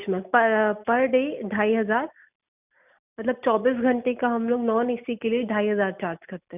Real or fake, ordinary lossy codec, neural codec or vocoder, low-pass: real; none; none; 3.6 kHz